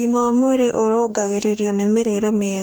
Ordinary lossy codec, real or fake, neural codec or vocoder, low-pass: none; fake; codec, 44.1 kHz, 2.6 kbps, DAC; none